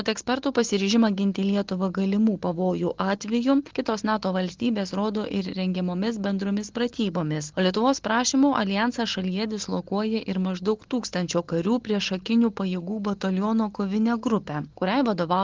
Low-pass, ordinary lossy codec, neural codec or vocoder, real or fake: 7.2 kHz; Opus, 16 kbps; none; real